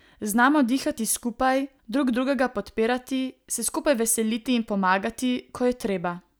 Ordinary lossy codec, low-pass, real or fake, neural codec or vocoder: none; none; real; none